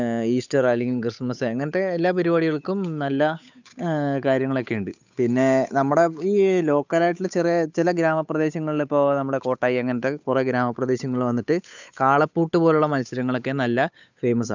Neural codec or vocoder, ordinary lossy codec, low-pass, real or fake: codec, 16 kHz, 8 kbps, FunCodec, trained on Chinese and English, 25 frames a second; none; 7.2 kHz; fake